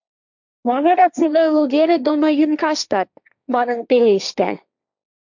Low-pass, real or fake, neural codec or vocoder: 7.2 kHz; fake; codec, 16 kHz, 1.1 kbps, Voila-Tokenizer